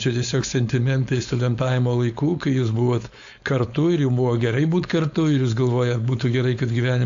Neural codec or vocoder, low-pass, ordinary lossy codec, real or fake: codec, 16 kHz, 4.8 kbps, FACodec; 7.2 kHz; AAC, 64 kbps; fake